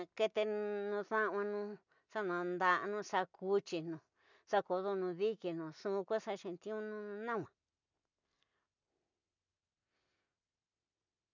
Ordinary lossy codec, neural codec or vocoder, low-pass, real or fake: none; none; 7.2 kHz; real